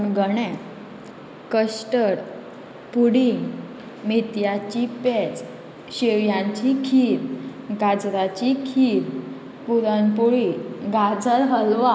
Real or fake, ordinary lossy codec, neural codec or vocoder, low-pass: real; none; none; none